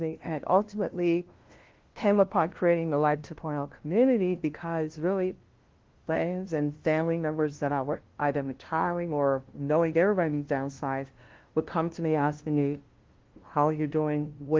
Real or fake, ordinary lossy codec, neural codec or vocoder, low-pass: fake; Opus, 16 kbps; codec, 16 kHz, 0.5 kbps, FunCodec, trained on LibriTTS, 25 frames a second; 7.2 kHz